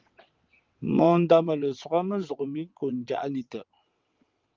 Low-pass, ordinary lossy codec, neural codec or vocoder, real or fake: 7.2 kHz; Opus, 32 kbps; vocoder, 22.05 kHz, 80 mel bands, WaveNeXt; fake